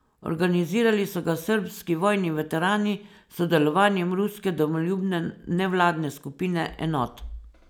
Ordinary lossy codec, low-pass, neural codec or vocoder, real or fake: none; none; none; real